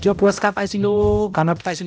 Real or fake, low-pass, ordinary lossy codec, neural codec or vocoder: fake; none; none; codec, 16 kHz, 0.5 kbps, X-Codec, HuBERT features, trained on balanced general audio